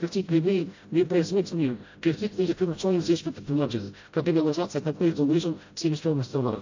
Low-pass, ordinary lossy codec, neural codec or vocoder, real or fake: 7.2 kHz; AAC, 48 kbps; codec, 16 kHz, 0.5 kbps, FreqCodec, smaller model; fake